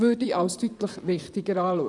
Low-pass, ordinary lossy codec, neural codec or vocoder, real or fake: 10.8 kHz; none; vocoder, 44.1 kHz, 128 mel bands, Pupu-Vocoder; fake